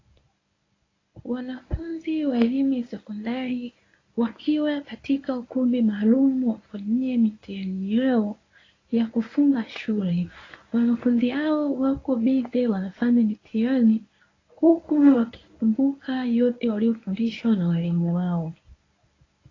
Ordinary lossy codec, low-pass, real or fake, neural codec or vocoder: AAC, 32 kbps; 7.2 kHz; fake; codec, 24 kHz, 0.9 kbps, WavTokenizer, medium speech release version 1